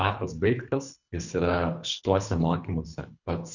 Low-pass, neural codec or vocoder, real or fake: 7.2 kHz; codec, 24 kHz, 3 kbps, HILCodec; fake